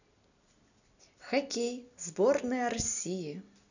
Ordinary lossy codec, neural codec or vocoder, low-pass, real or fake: none; none; 7.2 kHz; real